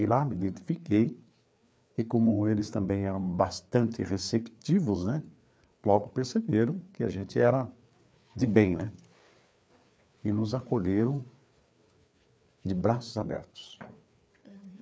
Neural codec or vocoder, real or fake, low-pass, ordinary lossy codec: codec, 16 kHz, 4 kbps, FreqCodec, larger model; fake; none; none